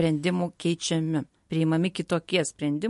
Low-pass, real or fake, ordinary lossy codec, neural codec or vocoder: 14.4 kHz; real; MP3, 48 kbps; none